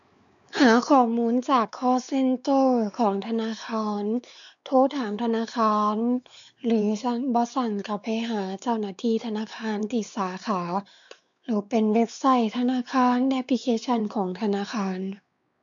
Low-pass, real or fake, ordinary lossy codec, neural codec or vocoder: 7.2 kHz; fake; none; codec, 16 kHz, 4 kbps, X-Codec, WavLM features, trained on Multilingual LibriSpeech